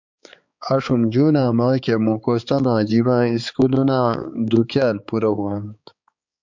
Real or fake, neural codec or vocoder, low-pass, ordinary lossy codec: fake; codec, 16 kHz, 4 kbps, X-Codec, HuBERT features, trained on balanced general audio; 7.2 kHz; MP3, 64 kbps